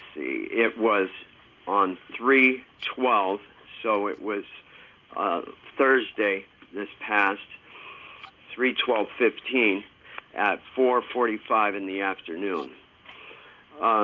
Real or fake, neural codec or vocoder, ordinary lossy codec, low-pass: real; none; Opus, 32 kbps; 7.2 kHz